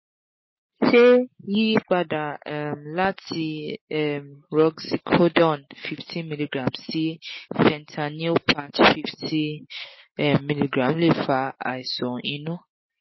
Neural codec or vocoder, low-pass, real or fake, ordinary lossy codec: none; 7.2 kHz; real; MP3, 24 kbps